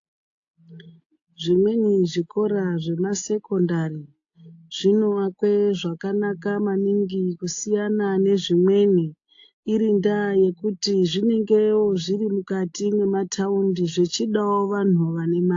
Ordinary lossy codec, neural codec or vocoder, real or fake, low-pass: AAC, 48 kbps; none; real; 7.2 kHz